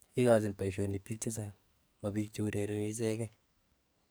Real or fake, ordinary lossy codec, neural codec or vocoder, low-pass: fake; none; codec, 44.1 kHz, 2.6 kbps, SNAC; none